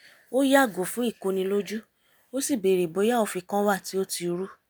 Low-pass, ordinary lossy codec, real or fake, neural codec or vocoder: none; none; real; none